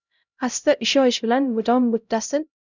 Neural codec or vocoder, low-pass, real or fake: codec, 16 kHz, 0.5 kbps, X-Codec, HuBERT features, trained on LibriSpeech; 7.2 kHz; fake